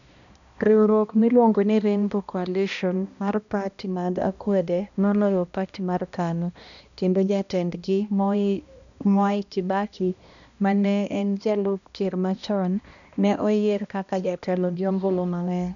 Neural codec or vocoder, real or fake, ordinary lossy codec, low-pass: codec, 16 kHz, 1 kbps, X-Codec, HuBERT features, trained on balanced general audio; fake; none; 7.2 kHz